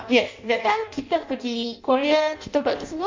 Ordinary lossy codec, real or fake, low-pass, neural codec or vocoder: MP3, 64 kbps; fake; 7.2 kHz; codec, 16 kHz in and 24 kHz out, 0.6 kbps, FireRedTTS-2 codec